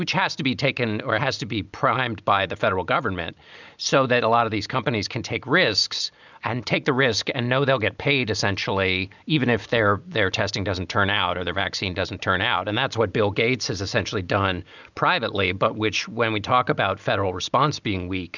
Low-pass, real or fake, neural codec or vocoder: 7.2 kHz; real; none